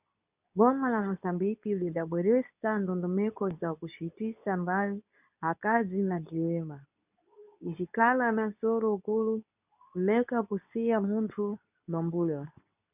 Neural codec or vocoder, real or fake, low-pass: codec, 24 kHz, 0.9 kbps, WavTokenizer, medium speech release version 2; fake; 3.6 kHz